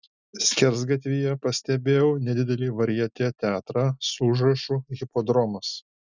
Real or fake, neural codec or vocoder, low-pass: real; none; 7.2 kHz